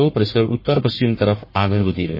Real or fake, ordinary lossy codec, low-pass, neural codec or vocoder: fake; MP3, 24 kbps; 5.4 kHz; codec, 44.1 kHz, 1.7 kbps, Pupu-Codec